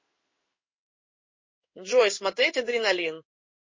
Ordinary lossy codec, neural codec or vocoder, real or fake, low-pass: MP3, 32 kbps; codec, 16 kHz in and 24 kHz out, 1 kbps, XY-Tokenizer; fake; 7.2 kHz